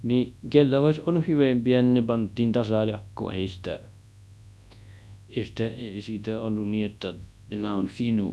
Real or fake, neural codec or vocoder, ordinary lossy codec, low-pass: fake; codec, 24 kHz, 0.9 kbps, WavTokenizer, large speech release; none; none